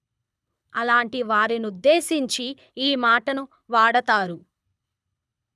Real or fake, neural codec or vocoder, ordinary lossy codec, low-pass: fake; codec, 24 kHz, 6 kbps, HILCodec; none; none